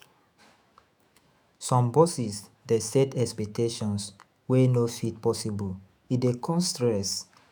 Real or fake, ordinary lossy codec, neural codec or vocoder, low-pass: fake; none; autoencoder, 48 kHz, 128 numbers a frame, DAC-VAE, trained on Japanese speech; none